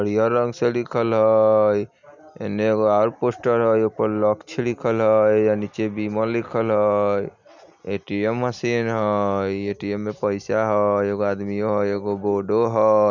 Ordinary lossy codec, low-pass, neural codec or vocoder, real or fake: none; 7.2 kHz; none; real